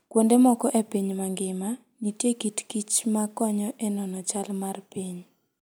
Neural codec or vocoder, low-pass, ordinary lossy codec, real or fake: none; none; none; real